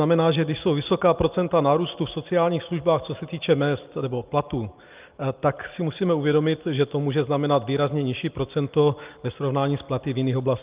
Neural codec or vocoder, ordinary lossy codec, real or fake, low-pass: none; Opus, 32 kbps; real; 3.6 kHz